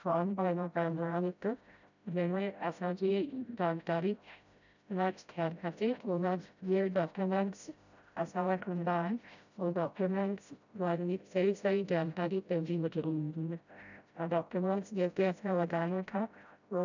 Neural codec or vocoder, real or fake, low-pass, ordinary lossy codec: codec, 16 kHz, 0.5 kbps, FreqCodec, smaller model; fake; 7.2 kHz; none